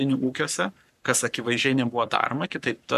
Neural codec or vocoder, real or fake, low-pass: codec, 44.1 kHz, 7.8 kbps, Pupu-Codec; fake; 14.4 kHz